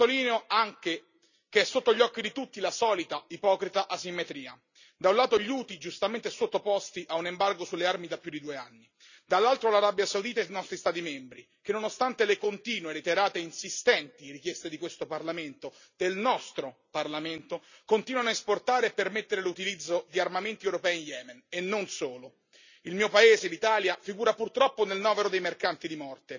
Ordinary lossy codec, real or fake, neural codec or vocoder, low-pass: none; real; none; 7.2 kHz